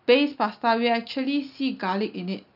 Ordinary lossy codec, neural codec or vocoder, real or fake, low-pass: none; none; real; 5.4 kHz